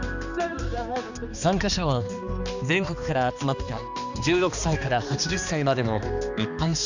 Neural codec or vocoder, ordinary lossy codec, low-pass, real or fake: codec, 16 kHz, 2 kbps, X-Codec, HuBERT features, trained on balanced general audio; none; 7.2 kHz; fake